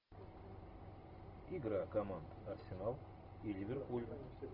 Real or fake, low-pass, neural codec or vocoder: real; 5.4 kHz; none